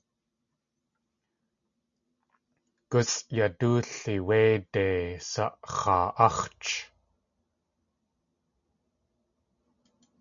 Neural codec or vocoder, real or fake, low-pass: none; real; 7.2 kHz